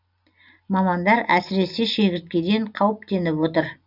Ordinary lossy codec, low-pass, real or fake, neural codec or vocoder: none; 5.4 kHz; real; none